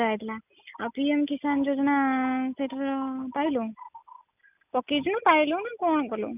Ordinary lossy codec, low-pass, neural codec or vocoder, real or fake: none; 3.6 kHz; none; real